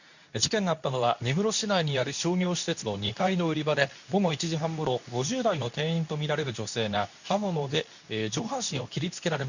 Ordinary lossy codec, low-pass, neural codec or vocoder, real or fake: none; 7.2 kHz; codec, 24 kHz, 0.9 kbps, WavTokenizer, medium speech release version 2; fake